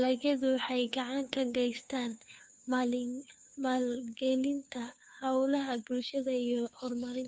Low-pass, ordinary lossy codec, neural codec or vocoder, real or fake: none; none; codec, 16 kHz, 2 kbps, FunCodec, trained on Chinese and English, 25 frames a second; fake